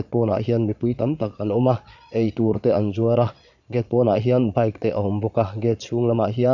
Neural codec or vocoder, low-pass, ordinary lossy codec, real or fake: vocoder, 22.05 kHz, 80 mel bands, Vocos; 7.2 kHz; none; fake